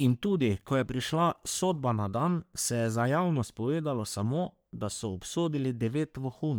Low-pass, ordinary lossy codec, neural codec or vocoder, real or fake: none; none; codec, 44.1 kHz, 3.4 kbps, Pupu-Codec; fake